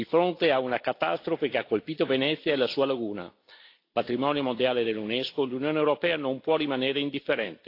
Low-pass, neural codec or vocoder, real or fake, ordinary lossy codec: 5.4 kHz; none; real; AAC, 32 kbps